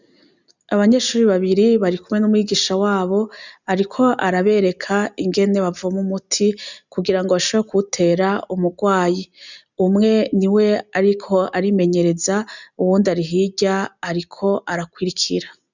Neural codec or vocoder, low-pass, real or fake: none; 7.2 kHz; real